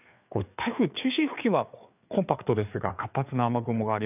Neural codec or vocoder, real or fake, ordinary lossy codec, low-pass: codec, 16 kHz, 4 kbps, FreqCodec, larger model; fake; none; 3.6 kHz